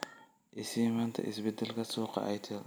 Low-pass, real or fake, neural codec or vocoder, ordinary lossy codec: none; real; none; none